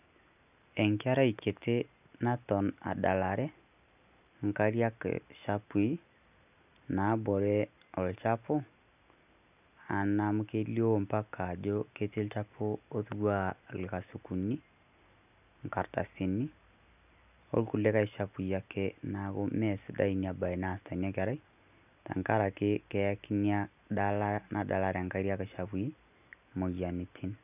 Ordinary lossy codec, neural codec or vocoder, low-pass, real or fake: none; none; 3.6 kHz; real